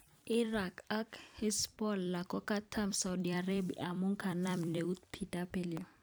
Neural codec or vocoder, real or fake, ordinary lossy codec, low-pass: vocoder, 44.1 kHz, 128 mel bands every 256 samples, BigVGAN v2; fake; none; none